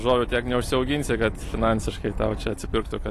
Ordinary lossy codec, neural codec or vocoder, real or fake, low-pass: AAC, 48 kbps; none; real; 14.4 kHz